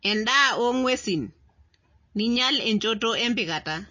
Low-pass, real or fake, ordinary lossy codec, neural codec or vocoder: 7.2 kHz; real; MP3, 32 kbps; none